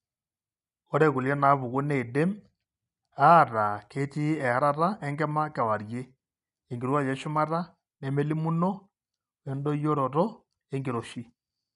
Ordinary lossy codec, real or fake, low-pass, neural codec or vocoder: none; real; 10.8 kHz; none